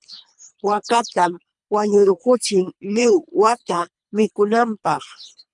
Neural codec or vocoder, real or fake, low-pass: codec, 24 kHz, 3 kbps, HILCodec; fake; 10.8 kHz